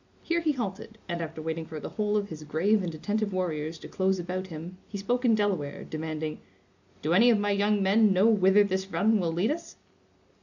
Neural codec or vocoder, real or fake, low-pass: none; real; 7.2 kHz